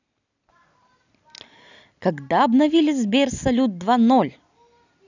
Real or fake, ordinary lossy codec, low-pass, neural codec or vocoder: real; none; 7.2 kHz; none